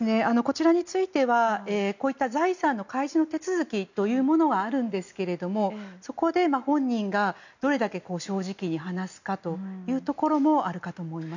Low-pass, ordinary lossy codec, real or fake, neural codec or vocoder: 7.2 kHz; none; real; none